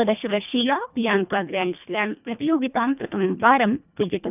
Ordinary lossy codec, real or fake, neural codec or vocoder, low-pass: none; fake; codec, 24 kHz, 1.5 kbps, HILCodec; 3.6 kHz